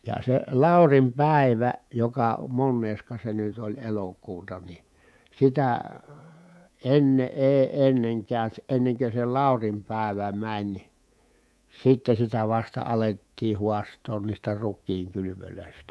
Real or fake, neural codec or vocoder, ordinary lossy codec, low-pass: fake; codec, 24 kHz, 3.1 kbps, DualCodec; none; none